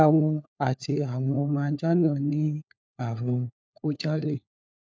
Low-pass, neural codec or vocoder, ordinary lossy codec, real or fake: none; codec, 16 kHz, 4 kbps, FunCodec, trained on LibriTTS, 50 frames a second; none; fake